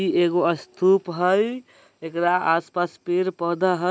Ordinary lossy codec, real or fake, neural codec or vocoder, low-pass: none; real; none; none